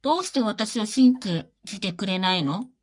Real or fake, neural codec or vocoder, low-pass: fake; codec, 44.1 kHz, 3.4 kbps, Pupu-Codec; 10.8 kHz